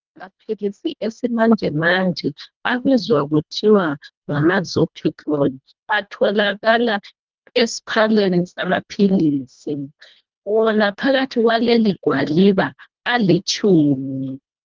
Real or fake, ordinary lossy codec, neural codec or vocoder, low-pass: fake; Opus, 32 kbps; codec, 24 kHz, 1.5 kbps, HILCodec; 7.2 kHz